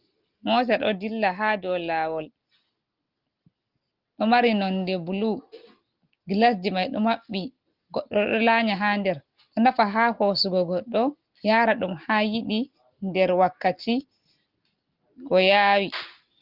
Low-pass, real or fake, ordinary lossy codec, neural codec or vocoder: 5.4 kHz; real; Opus, 32 kbps; none